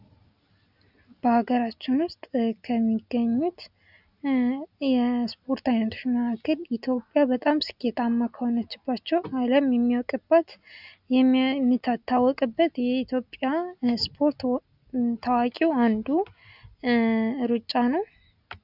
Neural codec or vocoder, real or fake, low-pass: none; real; 5.4 kHz